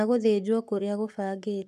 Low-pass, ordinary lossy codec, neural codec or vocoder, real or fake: 14.4 kHz; none; codec, 44.1 kHz, 7.8 kbps, Pupu-Codec; fake